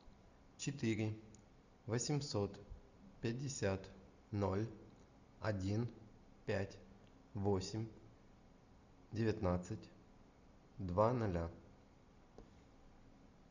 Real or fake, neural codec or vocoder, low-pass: real; none; 7.2 kHz